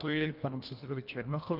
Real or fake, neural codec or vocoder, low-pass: fake; codec, 24 kHz, 1.5 kbps, HILCodec; 5.4 kHz